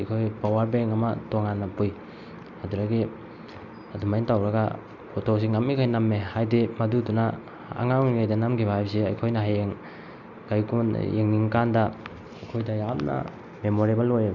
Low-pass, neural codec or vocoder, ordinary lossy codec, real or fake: 7.2 kHz; none; none; real